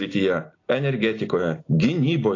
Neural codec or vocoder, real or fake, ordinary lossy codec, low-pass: none; real; AAC, 32 kbps; 7.2 kHz